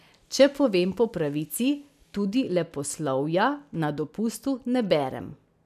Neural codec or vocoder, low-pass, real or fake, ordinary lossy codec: none; 14.4 kHz; real; none